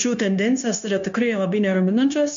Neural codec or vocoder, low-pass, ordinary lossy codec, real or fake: codec, 16 kHz, 0.9 kbps, LongCat-Audio-Codec; 7.2 kHz; MP3, 64 kbps; fake